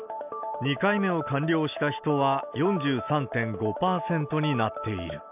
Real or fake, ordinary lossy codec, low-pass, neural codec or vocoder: real; none; 3.6 kHz; none